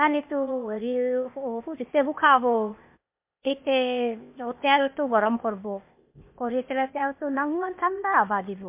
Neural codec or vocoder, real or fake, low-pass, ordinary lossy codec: codec, 16 kHz, 0.8 kbps, ZipCodec; fake; 3.6 kHz; MP3, 32 kbps